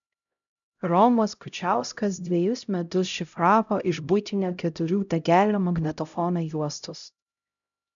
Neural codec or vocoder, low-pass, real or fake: codec, 16 kHz, 0.5 kbps, X-Codec, HuBERT features, trained on LibriSpeech; 7.2 kHz; fake